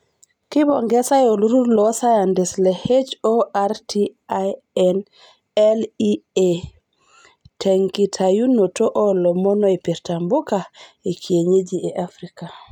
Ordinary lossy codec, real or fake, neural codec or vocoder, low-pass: none; real; none; 19.8 kHz